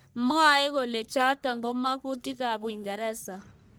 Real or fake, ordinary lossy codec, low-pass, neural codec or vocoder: fake; none; none; codec, 44.1 kHz, 1.7 kbps, Pupu-Codec